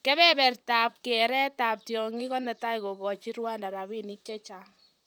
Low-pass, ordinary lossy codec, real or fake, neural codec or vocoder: none; none; fake; vocoder, 44.1 kHz, 128 mel bands, Pupu-Vocoder